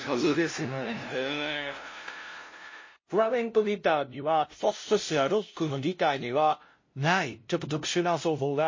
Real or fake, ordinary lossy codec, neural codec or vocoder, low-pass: fake; MP3, 32 kbps; codec, 16 kHz, 0.5 kbps, FunCodec, trained on LibriTTS, 25 frames a second; 7.2 kHz